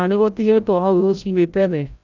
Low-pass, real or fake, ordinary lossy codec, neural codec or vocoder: 7.2 kHz; fake; none; codec, 16 kHz, 0.5 kbps, FreqCodec, larger model